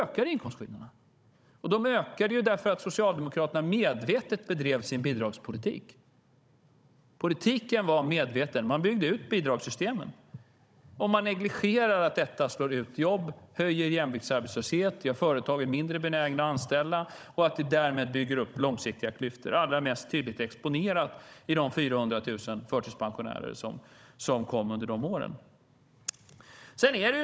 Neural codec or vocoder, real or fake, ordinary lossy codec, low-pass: codec, 16 kHz, 16 kbps, FunCodec, trained on Chinese and English, 50 frames a second; fake; none; none